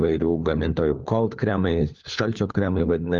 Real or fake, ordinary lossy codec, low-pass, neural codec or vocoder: fake; Opus, 24 kbps; 7.2 kHz; codec, 16 kHz, 4 kbps, FunCodec, trained on LibriTTS, 50 frames a second